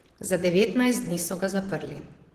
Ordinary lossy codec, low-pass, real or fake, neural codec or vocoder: Opus, 16 kbps; 14.4 kHz; fake; vocoder, 44.1 kHz, 128 mel bands, Pupu-Vocoder